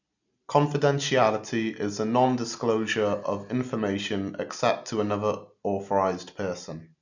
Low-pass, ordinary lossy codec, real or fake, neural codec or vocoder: 7.2 kHz; none; real; none